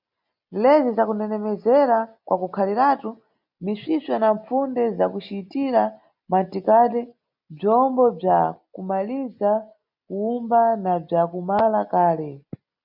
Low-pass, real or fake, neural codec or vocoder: 5.4 kHz; real; none